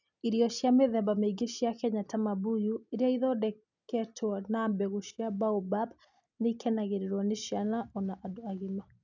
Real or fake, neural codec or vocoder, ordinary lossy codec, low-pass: real; none; none; 7.2 kHz